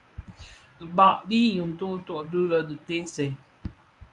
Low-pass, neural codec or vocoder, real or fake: 10.8 kHz; codec, 24 kHz, 0.9 kbps, WavTokenizer, medium speech release version 1; fake